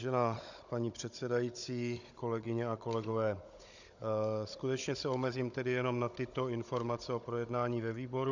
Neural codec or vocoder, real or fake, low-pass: codec, 16 kHz, 16 kbps, FunCodec, trained on Chinese and English, 50 frames a second; fake; 7.2 kHz